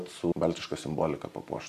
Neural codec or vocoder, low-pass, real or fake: vocoder, 44.1 kHz, 128 mel bands every 512 samples, BigVGAN v2; 14.4 kHz; fake